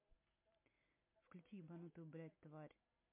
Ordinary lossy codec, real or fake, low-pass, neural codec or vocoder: MP3, 24 kbps; real; 3.6 kHz; none